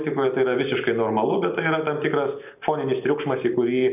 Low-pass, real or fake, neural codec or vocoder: 3.6 kHz; real; none